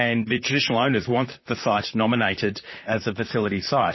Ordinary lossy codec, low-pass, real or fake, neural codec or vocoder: MP3, 24 kbps; 7.2 kHz; fake; vocoder, 44.1 kHz, 128 mel bands, Pupu-Vocoder